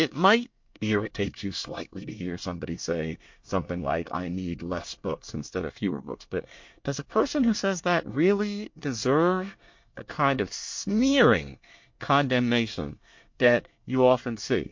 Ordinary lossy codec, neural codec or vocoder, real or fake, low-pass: MP3, 48 kbps; codec, 24 kHz, 1 kbps, SNAC; fake; 7.2 kHz